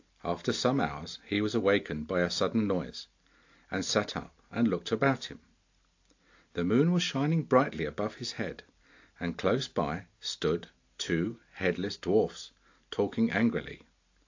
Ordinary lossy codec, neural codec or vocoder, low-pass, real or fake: AAC, 48 kbps; none; 7.2 kHz; real